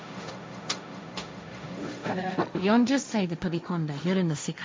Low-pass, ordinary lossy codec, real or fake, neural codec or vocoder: none; none; fake; codec, 16 kHz, 1.1 kbps, Voila-Tokenizer